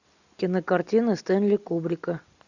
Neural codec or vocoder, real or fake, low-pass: none; real; 7.2 kHz